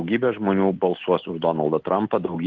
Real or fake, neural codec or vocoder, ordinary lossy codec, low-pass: real; none; Opus, 16 kbps; 7.2 kHz